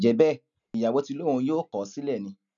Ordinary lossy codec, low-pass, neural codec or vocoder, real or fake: none; 7.2 kHz; none; real